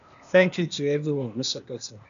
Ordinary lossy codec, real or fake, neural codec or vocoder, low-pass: none; fake; codec, 16 kHz, 0.8 kbps, ZipCodec; 7.2 kHz